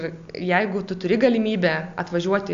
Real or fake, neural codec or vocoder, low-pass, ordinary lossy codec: real; none; 7.2 kHz; AAC, 64 kbps